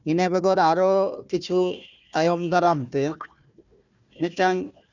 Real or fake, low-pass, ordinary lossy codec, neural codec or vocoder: fake; 7.2 kHz; none; codec, 16 kHz, 1 kbps, FunCodec, trained on Chinese and English, 50 frames a second